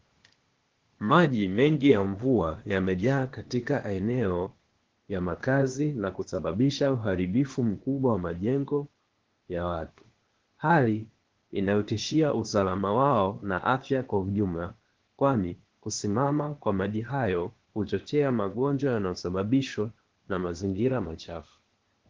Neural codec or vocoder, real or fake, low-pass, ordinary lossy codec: codec, 16 kHz, 0.8 kbps, ZipCodec; fake; 7.2 kHz; Opus, 16 kbps